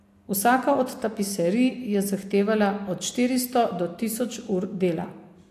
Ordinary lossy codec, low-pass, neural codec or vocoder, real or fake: AAC, 64 kbps; 14.4 kHz; none; real